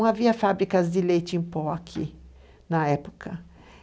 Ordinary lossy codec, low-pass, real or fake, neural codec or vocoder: none; none; real; none